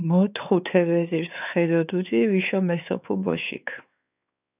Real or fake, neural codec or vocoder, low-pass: fake; codec, 16 kHz, 4 kbps, X-Codec, WavLM features, trained on Multilingual LibriSpeech; 3.6 kHz